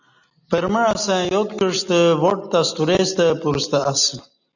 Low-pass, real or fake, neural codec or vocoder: 7.2 kHz; real; none